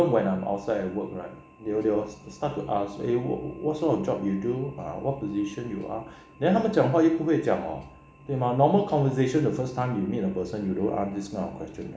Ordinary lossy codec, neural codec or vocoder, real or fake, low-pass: none; none; real; none